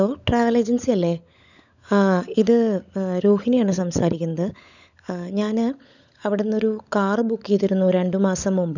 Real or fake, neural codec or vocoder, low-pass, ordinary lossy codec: fake; codec, 16 kHz, 16 kbps, FunCodec, trained on LibriTTS, 50 frames a second; 7.2 kHz; none